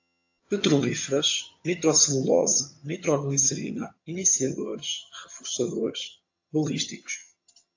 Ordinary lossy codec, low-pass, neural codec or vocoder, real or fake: AAC, 48 kbps; 7.2 kHz; vocoder, 22.05 kHz, 80 mel bands, HiFi-GAN; fake